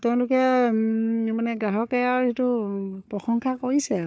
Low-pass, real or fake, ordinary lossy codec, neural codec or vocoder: none; fake; none; codec, 16 kHz, 4 kbps, FunCodec, trained on Chinese and English, 50 frames a second